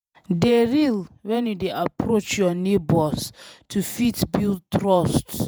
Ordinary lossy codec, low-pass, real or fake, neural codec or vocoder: none; none; fake; vocoder, 48 kHz, 128 mel bands, Vocos